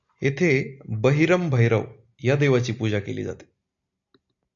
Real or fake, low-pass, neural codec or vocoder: real; 7.2 kHz; none